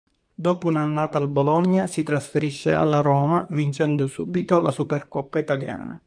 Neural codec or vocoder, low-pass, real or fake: codec, 24 kHz, 1 kbps, SNAC; 9.9 kHz; fake